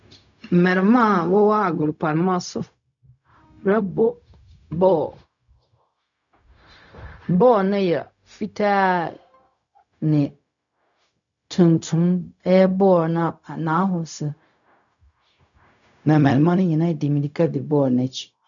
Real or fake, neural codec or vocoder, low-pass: fake; codec, 16 kHz, 0.4 kbps, LongCat-Audio-Codec; 7.2 kHz